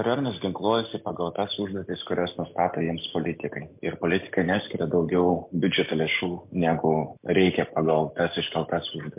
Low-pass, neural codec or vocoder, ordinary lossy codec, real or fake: 3.6 kHz; none; MP3, 32 kbps; real